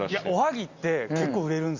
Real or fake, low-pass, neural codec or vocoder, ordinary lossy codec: real; 7.2 kHz; none; Opus, 64 kbps